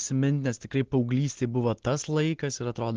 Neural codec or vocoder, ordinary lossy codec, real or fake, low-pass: none; Opus, 16 kbps; real; 7.2 kHz